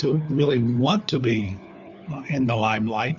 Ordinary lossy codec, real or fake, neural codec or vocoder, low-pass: Opus, 64 kbps; fake; codec, 16 kHz, 4 kbps, FunCodec, trained on LibriTTS, 50 frames a second; 7.2 kHz